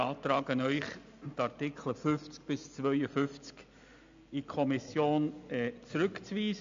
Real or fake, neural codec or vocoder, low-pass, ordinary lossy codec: real; none; 7.2 kHz; AAC, 64 kbps